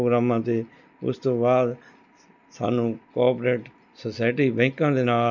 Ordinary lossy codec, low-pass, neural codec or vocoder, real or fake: none; 7.2 kHz; none; real